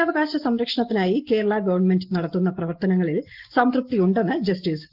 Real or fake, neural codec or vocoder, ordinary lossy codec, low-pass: real; none; Opus, 16 kbps; 5.4 kHz